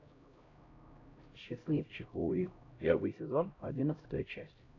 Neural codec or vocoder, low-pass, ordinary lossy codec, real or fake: codec, 16 kHz, 0.5 kbps, X-Codec, HuBERT features, trained on LibriSpeech; 7.2 kHz; AAC, 32 kbps; fake